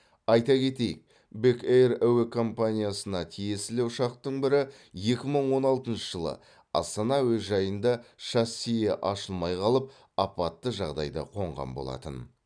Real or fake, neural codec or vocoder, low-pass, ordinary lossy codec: real; none; 9.9 kHz; none